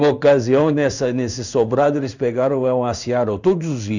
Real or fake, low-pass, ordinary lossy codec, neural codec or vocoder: fake; 7.2 kHz; none; codec, 16 kHz in and 24 kHz out, 1 kbps, XY-Tokenizer